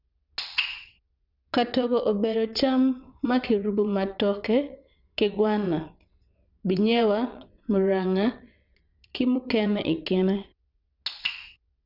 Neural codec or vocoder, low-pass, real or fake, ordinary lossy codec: vocoder, 22.05 kHz, 80 mel bands, WaveNeXt; 5.4 kHz; fake; none